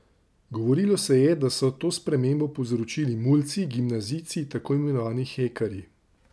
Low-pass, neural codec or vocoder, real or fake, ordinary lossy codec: none; none; real; none